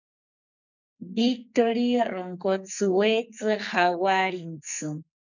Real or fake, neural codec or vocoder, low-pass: fake; codec, 44.1 kHz, 2.6 kbps, SNAC; 7.2 kHz